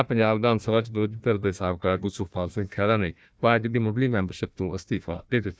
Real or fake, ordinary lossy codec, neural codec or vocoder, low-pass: fake; none; codec, 16 kHz, 1 kbps, FunCodec, trained on Chinese and English, 50 frames a second; none